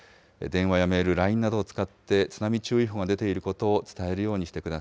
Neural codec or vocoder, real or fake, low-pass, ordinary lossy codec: none; real; none; none